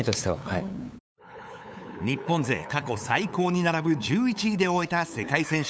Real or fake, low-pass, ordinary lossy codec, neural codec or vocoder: fake; none; none; codec, 16 kHz, 8 kbps, FunCodec, trained on LibriTTS, 25 frames a second